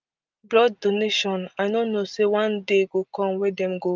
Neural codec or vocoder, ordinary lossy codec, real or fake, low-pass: none; Opus, 32 kbps; real; 7.2 kHz